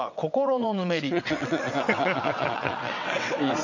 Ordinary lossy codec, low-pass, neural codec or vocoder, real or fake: none; 7.2 kHz; vocoder, 22.05 kHz, 80 mel bands, WaveNeXt; fake